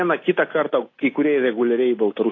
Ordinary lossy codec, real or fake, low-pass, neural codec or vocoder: AAC, 32 kbps; real; 7.2 kHz; none